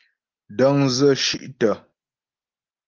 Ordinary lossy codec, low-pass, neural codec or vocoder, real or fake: Opus, 24 kbps; 7.2 kHz; none; real